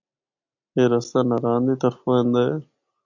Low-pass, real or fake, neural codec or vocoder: 7.2 kHz; real; none